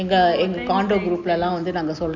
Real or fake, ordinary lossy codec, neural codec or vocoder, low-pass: real; none; none; 7.2 kHz